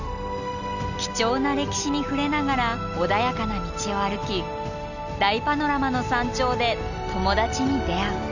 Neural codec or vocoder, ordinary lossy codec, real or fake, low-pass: none; none; real; 7.2 kHz